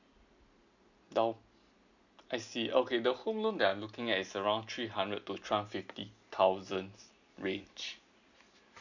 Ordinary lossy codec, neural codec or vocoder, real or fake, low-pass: none; none; real; 7.2 kHz